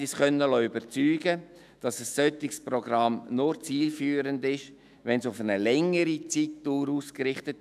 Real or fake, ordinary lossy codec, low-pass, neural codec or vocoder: fake; none; 14.4 kHz; autoencoder, 48 kHz, 128 numbers a frame, DAC-VAE, trained on Japanese speech